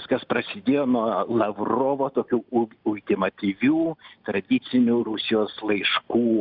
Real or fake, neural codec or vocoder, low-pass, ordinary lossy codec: real; none; 5.4 kHz; Opus, 64 kbps